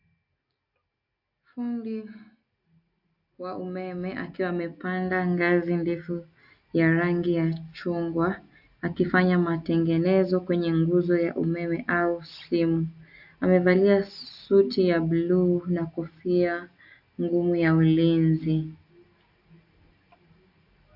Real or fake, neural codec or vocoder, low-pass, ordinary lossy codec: real; none; 5.4 kHz; AAC, 48 kbps